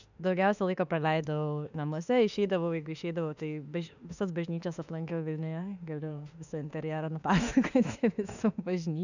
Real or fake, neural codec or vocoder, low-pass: fake; autoencoder, 48 kHz, 32 numbers a frame, DAC-VAE, trained on Japanese speech; 7.2 kHz